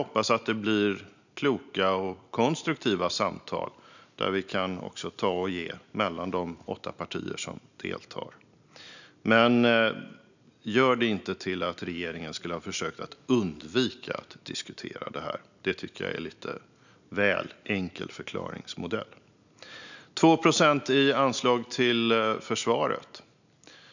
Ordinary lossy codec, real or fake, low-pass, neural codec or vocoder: none; real; 7.2 kHz; none